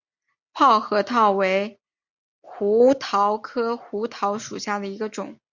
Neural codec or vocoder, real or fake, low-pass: none; real; 7.2 kHz